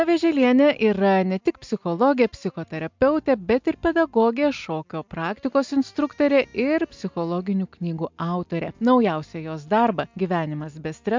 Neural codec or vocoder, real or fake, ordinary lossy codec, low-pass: none; real; MP3, 64 kbps; 7.2 kHz